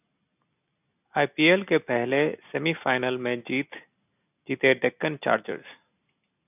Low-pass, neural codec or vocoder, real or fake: 3.6 kHz; none; real